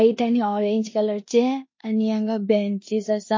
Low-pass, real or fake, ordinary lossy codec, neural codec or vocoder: 7.2 kHz; fake; MP3, 32 kbps; codec, 16 kHz in and 24 kHz out, 0.9 kbps, LongCat-Audio-Codec, four codebook decoder